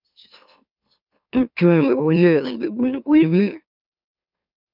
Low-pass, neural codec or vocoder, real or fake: 5.4 kHz; autoencoder, 44.1 kHz, a latent of 192 numbers a frame, MeloTTS; fake